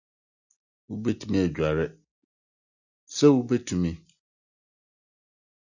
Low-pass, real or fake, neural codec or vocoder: 7.2 kHz; real; none